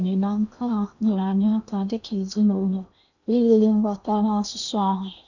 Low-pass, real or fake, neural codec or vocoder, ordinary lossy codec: 7.2 kHz; fake; codec, 16 kHz in and 24 kHz out, 0.8 kbps, FocalCodec, streaming, 65536 codes; none